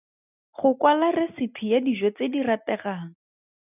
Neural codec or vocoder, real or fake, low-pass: none; real; 3.6 kHz